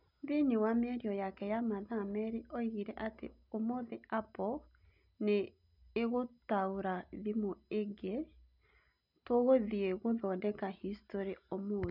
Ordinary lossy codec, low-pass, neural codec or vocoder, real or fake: none; 5.4 kHz; none; real